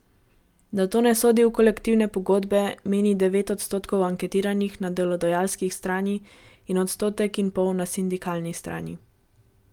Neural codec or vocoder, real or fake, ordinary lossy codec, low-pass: none; real; Opus, 32 kbps; 19.8 kHz